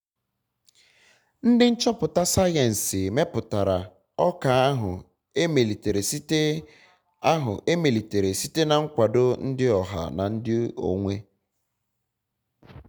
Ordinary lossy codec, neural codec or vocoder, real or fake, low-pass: none; none; real; none